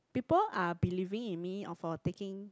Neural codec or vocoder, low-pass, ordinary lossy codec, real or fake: none; none; none; real